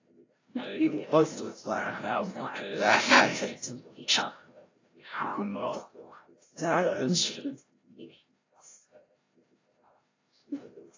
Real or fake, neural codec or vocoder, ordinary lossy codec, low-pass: fake; codec, 16 kHz, 0.5 kbps, FreqCodec, larger model; AAC, 32 kbps; 7.2 kHz